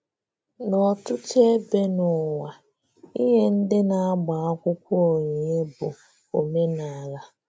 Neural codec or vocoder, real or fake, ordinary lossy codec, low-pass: none; real; none; none